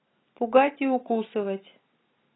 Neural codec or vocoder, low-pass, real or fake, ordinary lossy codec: none; 7.2 kHz; real; AAC, 16 kbps